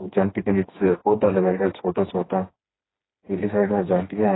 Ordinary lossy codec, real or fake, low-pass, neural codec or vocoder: AAC, 16 kbps; fake; 7.2 kHz; codec, 16 kHz, 2 kbps, FreqCodec, smaller model